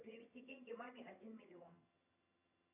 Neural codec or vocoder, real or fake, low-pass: vocoder, 22.05 kHz, 80 mel bands, HiFi-GAN; fake; 3.6 kHz